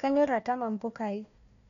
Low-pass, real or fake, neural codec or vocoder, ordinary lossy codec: 7.2 kHz; fake; codec, 16 kHz, 1 kbps, FunCodec, trained on Chinese and English, 50 frames a second; none